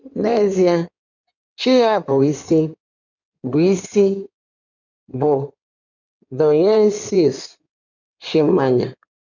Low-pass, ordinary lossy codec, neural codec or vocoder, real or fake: 7.2 kHz; none; codec, 16 kHz in and 24 kHz out, 2.2 kbps, FireRedTTS-2 codec; fake